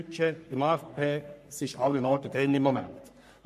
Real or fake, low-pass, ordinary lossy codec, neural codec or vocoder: fake; 14.4 kHz; MP3, 64 kbps; codec, 44.1 kHz, 3.4 kbps, Pupu-Codec